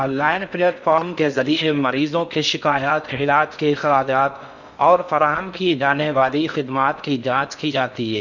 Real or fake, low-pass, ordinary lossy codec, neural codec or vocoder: fake; 7.2 kHz; none; codec, 16 kHz in and 24 kHz out, 0.6 kbps, FocalCodec, streaming, 4096 codes